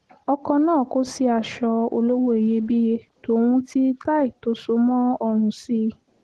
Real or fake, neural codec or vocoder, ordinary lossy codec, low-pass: real; none; Opus, 16 kbps; 10.8 kHz